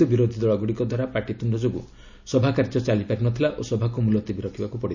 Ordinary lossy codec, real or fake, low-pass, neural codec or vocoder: none; real; 7.2 kHz; none